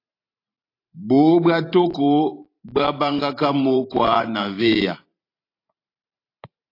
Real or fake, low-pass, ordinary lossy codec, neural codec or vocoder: fake; 5.4 kHz; AAC, 32 kbps; vocoder, 44.1 kHz, 128 mel bands every 512 samples, BigVGAN v2